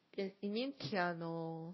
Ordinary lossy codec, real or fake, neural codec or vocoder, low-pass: MP3, 24 kbps; fake; codec, 16 kHz, 0.5 kbps, FunCodec, trained on Chinese and English, 25 frames a second; 7.2 kHz